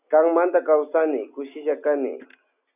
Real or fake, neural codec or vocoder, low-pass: real; none; 3.6 kHz